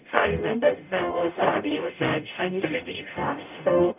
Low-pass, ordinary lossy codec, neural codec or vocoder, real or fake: 3.6 kHz; none; codec, 44.1 kHz, 0.9 kbps, DAC; fake